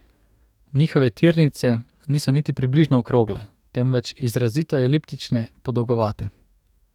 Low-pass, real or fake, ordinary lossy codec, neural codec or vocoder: 19.8 kHz; fake; none; codec, 44.1 kHz, 2.6 kbps, DAC